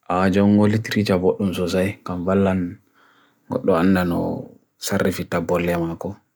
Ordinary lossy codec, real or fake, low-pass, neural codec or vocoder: none; real; none; none